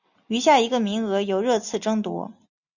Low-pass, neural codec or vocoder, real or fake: 7.2 kHz; none; real